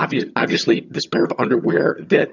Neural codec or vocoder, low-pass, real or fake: vocoder, 22.05 kHz, 80 mel bands, HiFi-GAN; 7.2 kHz; fake